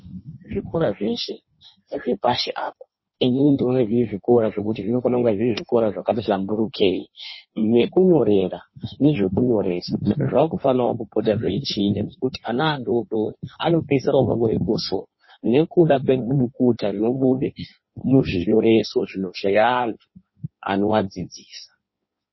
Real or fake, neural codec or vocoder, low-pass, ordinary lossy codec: fake; codec, 16 kHz in and 24 kHz out, 1.1 kbps, FireRedTTS-2 codec; 7.2 kHz; MP3, 24 kbps